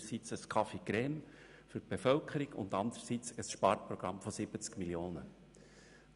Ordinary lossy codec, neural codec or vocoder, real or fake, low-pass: none; none; real; 10.8 kHz